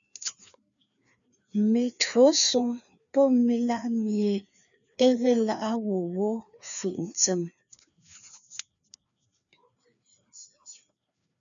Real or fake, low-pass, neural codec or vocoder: fake; 7.2 kHz; codec, 16 kHz, 2 kbps, FreqCodec, larger model